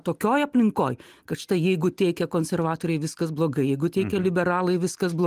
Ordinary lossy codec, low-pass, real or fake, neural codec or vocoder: Opus, 24 kbps; 14.4 kHz; real; none